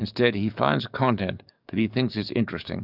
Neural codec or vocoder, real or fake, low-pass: codec, 44.1 kHz, 7.8 kbps, DAC; fake; 5.4 kHz